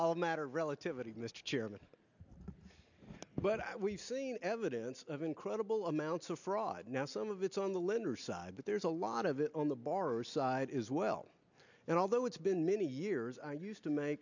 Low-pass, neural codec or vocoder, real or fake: 7.2 kHz; none; real